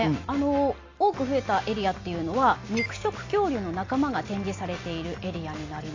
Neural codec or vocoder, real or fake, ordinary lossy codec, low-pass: none; real; AAC, 32 kbps; 7.2 kHz